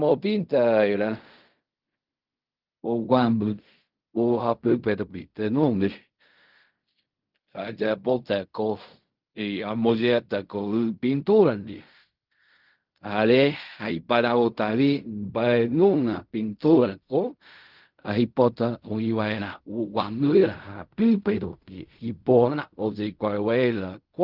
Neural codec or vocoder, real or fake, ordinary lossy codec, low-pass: codec, 16 kHz in and 24 kHz out, 0.4 kbps, LongCat-Audio-Codec, fine tuned four codebook decoder; fake; Opus, 32 kbps; 5.4 kHz